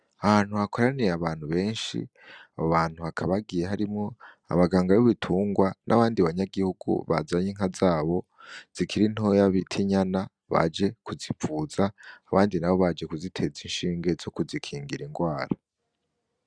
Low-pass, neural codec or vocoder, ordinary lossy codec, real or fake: 9.9 kHz; none; Opus, 64 kbps; real